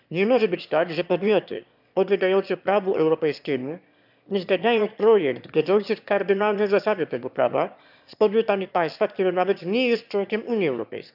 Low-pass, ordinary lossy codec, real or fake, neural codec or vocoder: 5.4 kHz; none; fake; autoencoder, 22.05 kHz, a latent of 192 numbers a frame, VITS, trained on one speaker